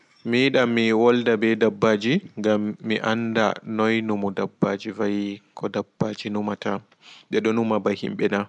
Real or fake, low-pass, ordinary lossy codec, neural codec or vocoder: real; 10.8 kHz; none; none